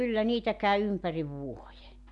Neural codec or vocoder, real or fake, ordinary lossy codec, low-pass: none; real; none; 10.8 kHz